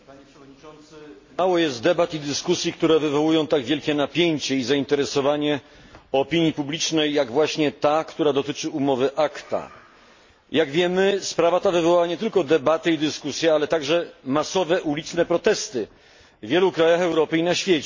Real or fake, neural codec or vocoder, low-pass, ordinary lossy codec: real; none; 7.2 kHz; MP3, 32 kbps